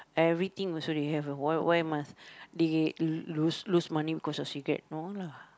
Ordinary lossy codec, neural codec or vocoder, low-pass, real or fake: none; none; none; real